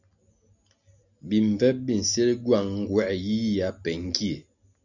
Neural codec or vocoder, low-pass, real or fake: none; 7.2 kHz; real